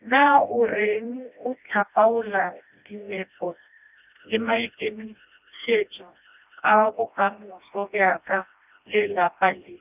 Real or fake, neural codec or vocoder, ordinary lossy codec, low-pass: fake; codec, 16 kHz, 1 kbps, FreqCodec, smaller model; none; 3.6 kHz